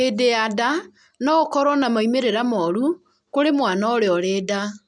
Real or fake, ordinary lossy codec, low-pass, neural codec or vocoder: real; none; 9.9 kHz; none